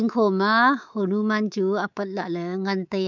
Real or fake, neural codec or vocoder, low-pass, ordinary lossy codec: fake; codec, 24 kHz, 3.1 kbps, DualCodec; 7.2 kHz; none